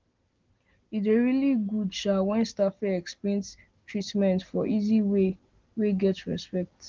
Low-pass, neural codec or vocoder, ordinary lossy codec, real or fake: 7.2 kHz; none; Opus, 16 kbps; real